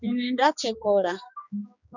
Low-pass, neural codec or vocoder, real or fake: 7.2 kHz; codec, 16 kHz, 2 kbps, X-Codec, HuBERT features, trained on general audio; fake